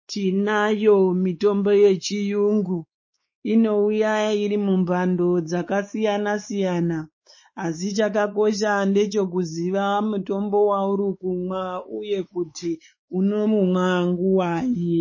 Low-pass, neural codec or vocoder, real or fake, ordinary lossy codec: 7.2 kHz; codec, 16 kHz, 4 kbps, X-Codec, WavLM features, trained on Multilingual LibriSpeech; fake; MP3, 32 kbps